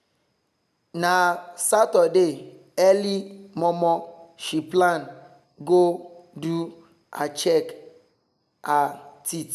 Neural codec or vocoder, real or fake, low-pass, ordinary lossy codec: none; real; 14.4 kHz; none